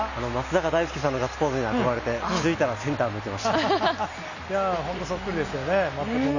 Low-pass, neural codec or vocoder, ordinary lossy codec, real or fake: 7.2 kHz; none; none; real